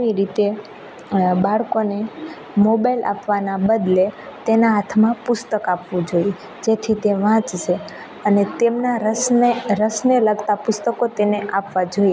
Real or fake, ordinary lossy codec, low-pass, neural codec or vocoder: real; none; none; none